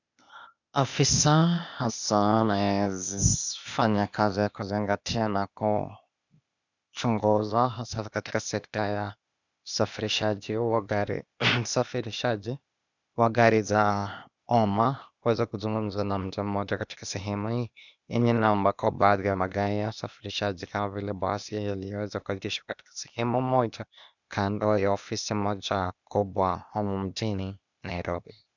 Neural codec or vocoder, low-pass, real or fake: codec, 16 kHz, 0.8 kbps, ZipCodec; 7.2 kHz; fake